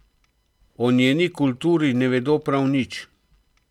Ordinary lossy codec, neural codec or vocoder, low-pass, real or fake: MP3, 96 kbps; none; 19.8 kHz; real